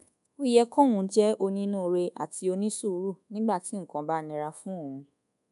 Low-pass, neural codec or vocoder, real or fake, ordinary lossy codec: 10.8 kHz; codec, 24 kHz, 1.2 kbps, DualCodec; fake; none